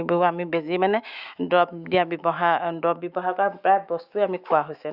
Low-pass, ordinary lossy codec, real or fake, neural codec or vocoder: 5.4 kHz; Opus, 64 kbps; fake; autoencoder, 48 kHz, 128 numbers a frame, DAC-VAE, trained on Japanese speech